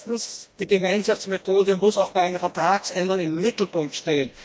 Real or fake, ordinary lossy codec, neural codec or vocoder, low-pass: fake; none; codec, 16 kHz, 1 kbps, FreqCodec, smaller model; none